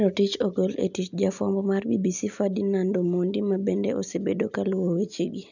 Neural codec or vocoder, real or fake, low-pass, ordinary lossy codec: none; real; 7.2 kHz; none